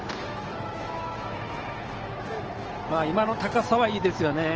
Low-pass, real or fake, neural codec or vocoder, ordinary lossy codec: 7.2 kHz; fake; autoencoder, 48 kHz, 128 numbers a frame, DAC-VAE, trained on Japanese speech; Opus, 16 kbps